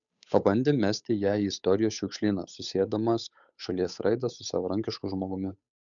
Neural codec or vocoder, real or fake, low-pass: codec, 16 kHz, 8 kbps, FunCodec, trained on Chinese and English, 25 frames a second; fake; 7.2 kHz